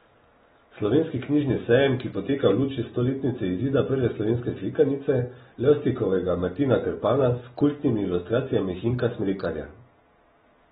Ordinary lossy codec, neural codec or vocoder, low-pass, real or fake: AAC, 16 kbps; none; 19.8 kHz; real